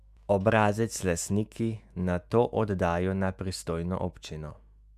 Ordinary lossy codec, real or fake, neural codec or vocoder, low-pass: Opus, 64 kbps; fake; autoencoder, 48 kHz, 128 numbers a frame, DAC-VAE, trained on Japanese speech; 14.4 kHz